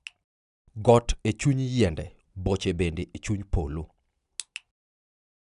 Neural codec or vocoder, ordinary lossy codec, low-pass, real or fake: none; none; 10.8 kHz; real